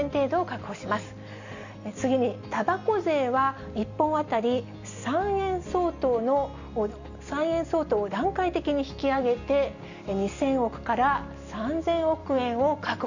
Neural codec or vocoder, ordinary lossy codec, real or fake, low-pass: none; Opus, 64 kbps; real; 7.2 kHz